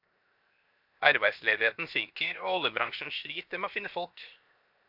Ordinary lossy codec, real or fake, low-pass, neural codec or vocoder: AAC, 48 kbps; fake; 5.4 kHz; codec, 16 kHz, 0.7 kbps, FocalCodec